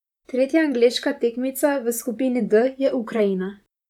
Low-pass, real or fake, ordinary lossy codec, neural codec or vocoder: 19.8 kHz; fake; none; vocoder, 44.1 kHz, 128 mel bands, Pupu-Vocoder